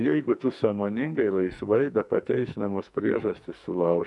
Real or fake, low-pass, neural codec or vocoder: fake; 10.8 kHz; codec, 44.1 kHz, 2.6 kbps, SNAC